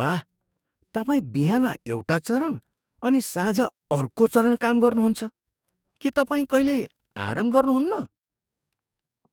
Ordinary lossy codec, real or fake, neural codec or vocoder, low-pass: none; fake; codec, 44.1 kHz, 2.6 kbps, DAC; 19.8 kHz